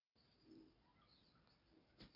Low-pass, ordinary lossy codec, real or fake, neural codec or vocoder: 5.4 kHz; none; real; none